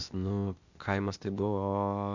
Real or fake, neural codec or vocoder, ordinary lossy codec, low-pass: fake; codec, 16 kHz, 0.8 kbps, ZipCodec; Opus, 64 kbps; 7.2 kHz